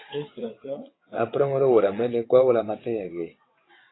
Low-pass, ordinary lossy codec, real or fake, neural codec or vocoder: 7.2 kHz; AAC, 16 kbps; fake; vocoder, 22.05 kHz, 80 mel bands, WaveNeXt